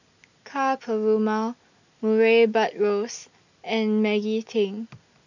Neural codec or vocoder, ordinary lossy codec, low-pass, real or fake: none; none; 7.2 kHz; real